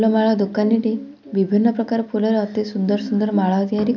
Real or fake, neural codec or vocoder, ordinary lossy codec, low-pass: real; none; none; 7.2 kHz